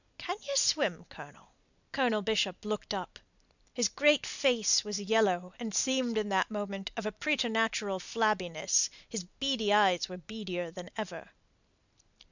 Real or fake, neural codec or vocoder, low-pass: real; none; 7.2 kHz